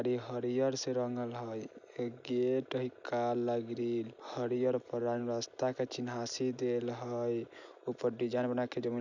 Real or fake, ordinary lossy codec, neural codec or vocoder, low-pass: real; none; none; 7.2 kHz